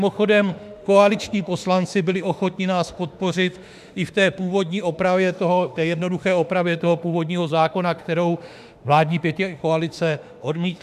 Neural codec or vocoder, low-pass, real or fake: autoencoder, 48 kHz, 32 numbers a frame, DAC-VAE, trained on Japanese speech; 14.4 kHz; fake